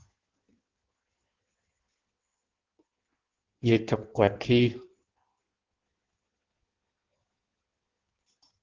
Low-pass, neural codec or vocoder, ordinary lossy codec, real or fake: 7.2 kHz; codec, 16 kHz in and 24 kHz out, 1.1 kbps, FireRedTTS-2 codec; Opus, 16 kbps; fake